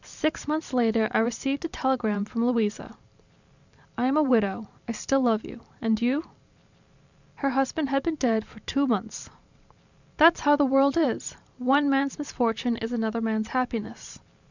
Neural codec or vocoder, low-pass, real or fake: vocoder, 44.1 kHz, 128 mel bands every 512 samples, BigVGAN v2; 7.2 kHz; fake